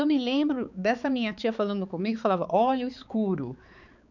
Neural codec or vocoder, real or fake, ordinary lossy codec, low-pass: codec, 16 kHz, 4 kbps, X-Codec, HuBERT features, trained on balanced general audio; fake; none; 7.2 kHz